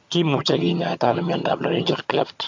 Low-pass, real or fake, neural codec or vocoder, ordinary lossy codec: 7.2 kHz; fake; vocoder, 22.05 kHz, 80 mel bands, HiFi-GAN; MP3, 48 kbps